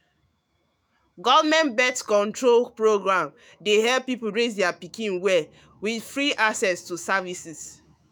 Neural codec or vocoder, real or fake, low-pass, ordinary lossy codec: autoencoder, 48 kHz, 128 numbers a frame, DAC-VAE, trained on Japanese speech; fake; none; none